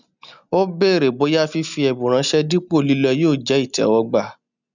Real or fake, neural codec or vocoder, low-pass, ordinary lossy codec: real; none; 7.2 kHz; none